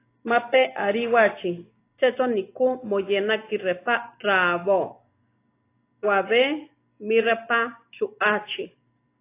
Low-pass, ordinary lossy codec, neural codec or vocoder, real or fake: 3.6 kHz; AAC, 24 kbps; none; real